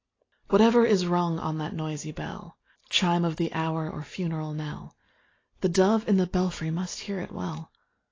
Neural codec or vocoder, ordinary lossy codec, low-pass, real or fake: none; AAC, 32 kbps; 7.2 kHz; real